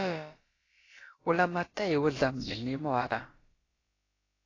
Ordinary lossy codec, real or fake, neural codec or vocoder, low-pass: AAC, 32 kbps; fake; codec, 16 kHz, about 1 kbps, DyCAST, with the encoder's durations; 7.2 kHz